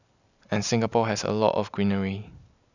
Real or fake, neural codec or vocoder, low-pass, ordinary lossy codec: real; none; 7.2 kHz; none